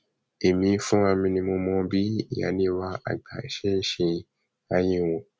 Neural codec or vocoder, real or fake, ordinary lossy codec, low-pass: none; real; none; none